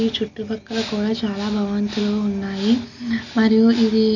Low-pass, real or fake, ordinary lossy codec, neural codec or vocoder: 7.2 kHz; real; none; none